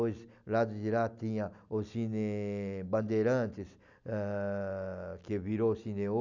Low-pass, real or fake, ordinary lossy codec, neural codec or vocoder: 7.2 kHz; real; none; none